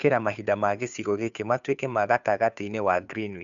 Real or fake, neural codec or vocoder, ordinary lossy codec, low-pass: fake; codec, 16 kHz, 2 kbps, FunCodec, trained on Chinese and English, 25 frames a second; none; 7.2 kHz